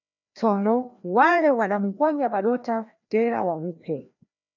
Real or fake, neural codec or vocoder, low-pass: fake; codec, 16 kHz, 1 kbps, FreqCodec, larger model; 7.2 kHz